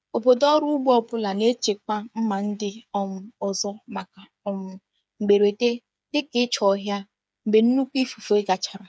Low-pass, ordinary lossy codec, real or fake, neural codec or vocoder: none; none; fake; codec, 16 kHz, 8 kbps, FreqCodec, smaller model